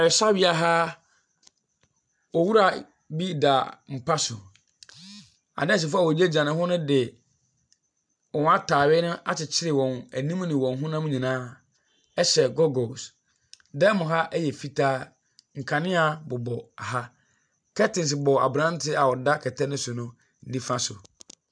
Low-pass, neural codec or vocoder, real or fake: 9.9 kHz; none; real